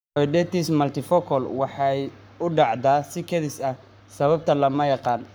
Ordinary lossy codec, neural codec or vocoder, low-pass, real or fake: none; codec, 44.1 kHz, 7.8 kbps, Pupu-Codec; none; fake